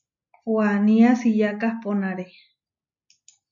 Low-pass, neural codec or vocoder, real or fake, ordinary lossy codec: 7.2 kHz; none; real; AAC, 64 kbps